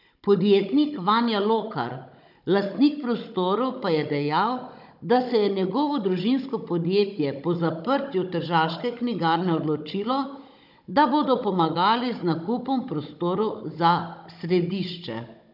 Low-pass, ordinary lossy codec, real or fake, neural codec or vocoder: 5.4 kHz; none; fake; codec, 16 kHz, 16 kbps, FunCodec, trained on Chinese and English, 50 frames a second